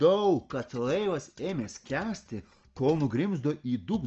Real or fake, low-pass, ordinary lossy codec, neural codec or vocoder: real; 7.2 kHz; Opus, 24 kbps; none